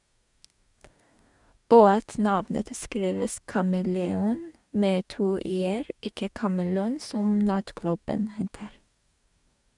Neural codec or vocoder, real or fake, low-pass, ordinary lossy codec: codec, 44.1 kHz, 2.6 kbps, DAC; fake; 10.8 kHz; none